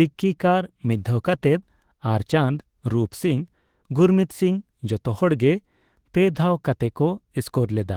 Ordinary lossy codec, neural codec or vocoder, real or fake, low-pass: Opus, 16 kbps; autoencoder, 48 kHz, 32 numbers a frame, DAC-VAE, trained on Japanese speech; fake; 19.8 kHz